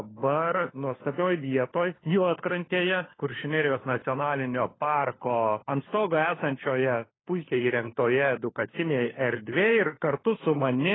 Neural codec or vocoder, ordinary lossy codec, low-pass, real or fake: codec, 16 kHz, 4 kbps, FunCodec, trained on LibriTTS, 50 frames a second; AAC, 16 kbps; 7.2 kHz; fake